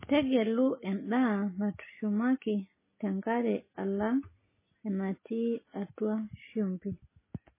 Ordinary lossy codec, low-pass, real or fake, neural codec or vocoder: MP3, 16 kbps; 3.6 kHz; real; none